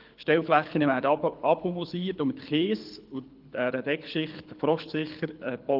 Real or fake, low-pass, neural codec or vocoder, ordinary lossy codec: fake; 5.4 kHz; codec, 24 kHz, 6 kbps, HILCodec; Opus, 32 kbps